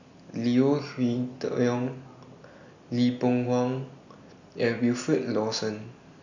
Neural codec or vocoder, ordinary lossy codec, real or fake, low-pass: none; none; real; 7.2 kHz